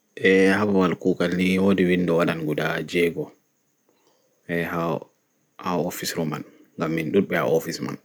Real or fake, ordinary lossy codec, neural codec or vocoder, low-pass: real; none; none; none